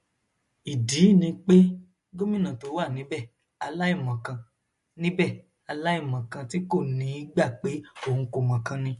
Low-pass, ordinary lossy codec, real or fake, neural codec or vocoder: 14.4 kHz; MP3, 48 kbps; real; none